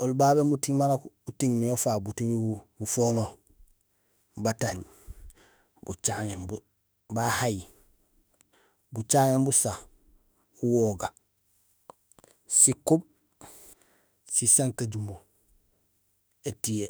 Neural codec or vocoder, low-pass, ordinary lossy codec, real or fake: autoencoder, 48 kHz, 32 numbers a frame, DAC-VAE, trained on Japanese speech; none; none; fake